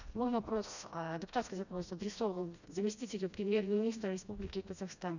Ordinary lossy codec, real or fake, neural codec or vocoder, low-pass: none; fake; codec, 16 kHz, 1 kbps, FreqCodec, smaller model; 7.2 kHz